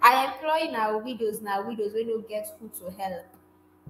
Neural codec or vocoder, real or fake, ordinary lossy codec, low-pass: autoencoder, 48 kHz, 128 numbers a frame, DAC-VAE, trained on Japanese speech; fake; AAC, 48 kbps; 19.8 kHz